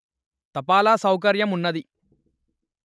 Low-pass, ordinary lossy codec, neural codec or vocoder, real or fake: none; none; none; real